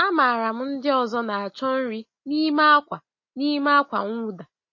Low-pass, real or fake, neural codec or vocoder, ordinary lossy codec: 7.2 kHz; real; none; MP3, 32 kbps